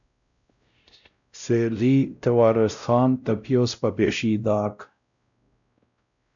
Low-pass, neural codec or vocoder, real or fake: 7.2 kHz; codec, 16 kHz, 0.5 kbps, X-Codec, WavLM features, trained on Multilingual LibriSpeech; fake